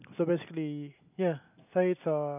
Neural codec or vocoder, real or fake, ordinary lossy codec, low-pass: none; real; none; 3.6 kHz